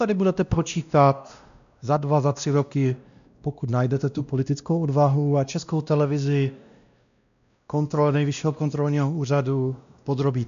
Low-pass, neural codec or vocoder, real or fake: 7.2 kHz; codec, 16 kHz, 1 kbps, X-Codec, WavLM features, trained on Multilingual LibriSpeech; fake